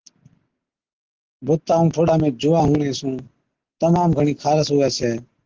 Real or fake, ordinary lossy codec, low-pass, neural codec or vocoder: real; Opus, 16 kbps; 7.2 kHz; none